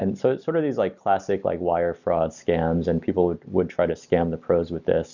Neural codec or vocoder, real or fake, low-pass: none; real; 7.2 kHz